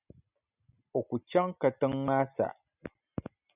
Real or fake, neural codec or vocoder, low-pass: real; none; 3.6 kHz